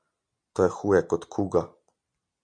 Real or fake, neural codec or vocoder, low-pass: real; none; 9.9 kHz